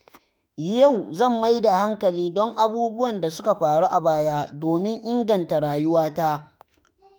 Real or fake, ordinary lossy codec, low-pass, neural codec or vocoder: fake; none; none; autoencoder, 48 kHz, 32 numbers a frame, DAC-VAE, trained on Japanese speech